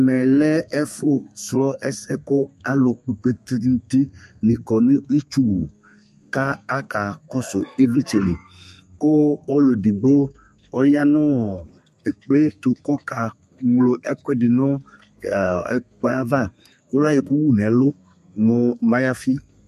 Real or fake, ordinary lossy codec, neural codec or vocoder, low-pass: fake; MP3, 64 kbps; codec, 32 kHz, 1.9 kbps, SNAC; 14.4 kHz